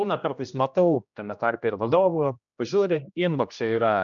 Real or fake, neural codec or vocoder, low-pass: fake; codec, 16 kHz, 1 kbps, X-Codec, HuBERT features, trained on general audio; 7.2 kHz